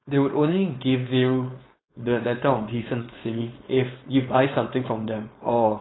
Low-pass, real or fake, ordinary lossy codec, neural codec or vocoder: 7.2 kHz; fake; AAC, 16 kbps; codec, 16 kHz, 4.8 kbps, FACodec